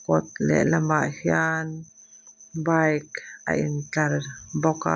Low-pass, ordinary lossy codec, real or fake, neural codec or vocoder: none; none; real; none